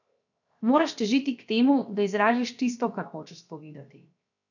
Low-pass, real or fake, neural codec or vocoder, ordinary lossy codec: 7.2 kHz; fake; codec, 16 kHz, 0.7 kbps, FocalCodec; none